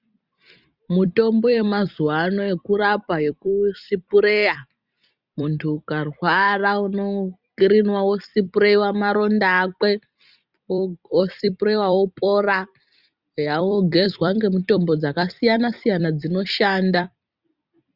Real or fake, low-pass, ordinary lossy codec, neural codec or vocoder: fake; 5.4 kHz; Opus, 64 kbps; vocoder, 44.1 kHz, 128 mel bands every 256 samples, BigVGAN v2